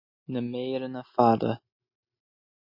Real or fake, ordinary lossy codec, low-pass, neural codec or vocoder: real; MP3, 32 kbps; 5.4 kHz; none